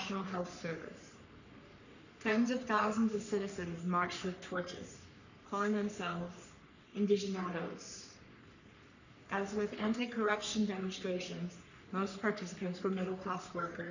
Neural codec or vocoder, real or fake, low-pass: codec, 44.1 kHz, 3.4 kbps, Pupu-Codec; fake; 7.2 kHz